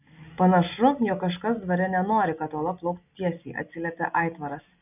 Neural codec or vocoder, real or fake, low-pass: none; real; 3.6 kHz